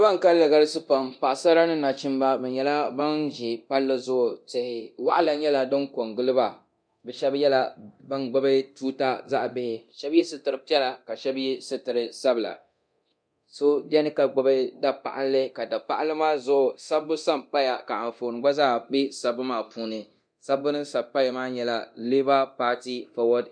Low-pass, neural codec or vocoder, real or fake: 9.9 kHz; codec, 24 kHz, 0.9 kbps, DualCodec; fake